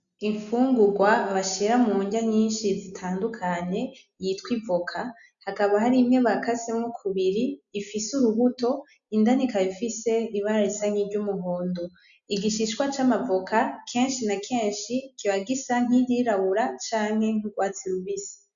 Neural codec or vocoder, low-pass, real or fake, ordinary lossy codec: none; 7.2 kHz; real; MP3, 96 kbps